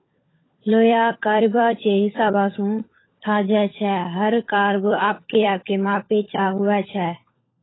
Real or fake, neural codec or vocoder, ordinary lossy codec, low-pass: fake; codec, 16 kHz, 16 kbps, FunCodec, trained on LibriTTS, 50 frames a second; AAC, 16 kbps; 7.2 kHz